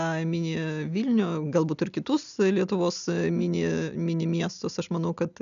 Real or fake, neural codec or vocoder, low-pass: real; none; 7.2 kHz